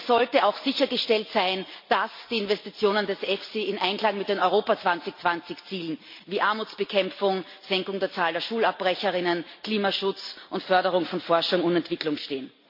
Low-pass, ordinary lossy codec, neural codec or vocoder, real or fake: 5.4 kHz; MP3, 32 kbps; none; real